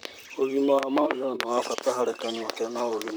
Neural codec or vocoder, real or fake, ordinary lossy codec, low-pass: vocoder, 44.1 kHz, 128 mel bands, Pupu-Vocoder; fake; none; none